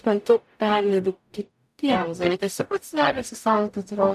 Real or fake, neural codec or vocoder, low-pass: fake; codec, 44.1 kHz, 0.9 kbps, DAC; 14.4 kHz